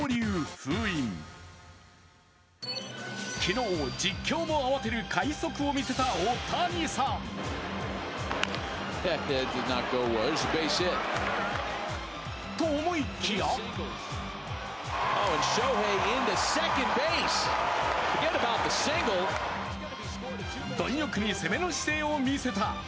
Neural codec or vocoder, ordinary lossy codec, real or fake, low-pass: none; none; real; none